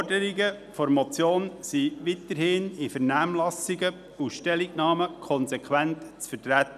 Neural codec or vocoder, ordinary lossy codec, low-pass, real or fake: none; none; 14.4 kHz; real